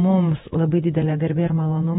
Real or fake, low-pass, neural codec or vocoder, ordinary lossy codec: fake; 19.8 kHz; vocoder, 48 kHz, 128 mel bands, Vocos; AAC, 16 kbps